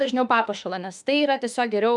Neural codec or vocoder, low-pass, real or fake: autoencoder, 48 kHz, 32 numbers a frame, DAC-VAE, trained on Japanese speech; 10.8 kHz; fake